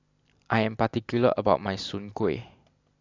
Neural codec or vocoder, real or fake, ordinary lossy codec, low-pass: none; real; AAC, 48 kbps; 7.2 kHz